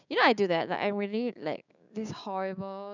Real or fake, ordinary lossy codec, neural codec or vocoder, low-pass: fake; none; codec, 16 kHz, 6 kbps, DAC; 7.2 kHz